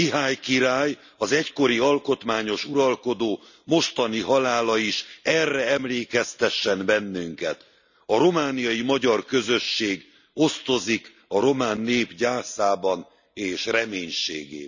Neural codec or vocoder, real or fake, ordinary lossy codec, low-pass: none; real; none; 7.2 kHz